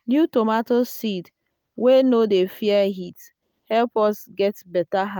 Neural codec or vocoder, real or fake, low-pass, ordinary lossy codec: none; real; 19.8 kHz; none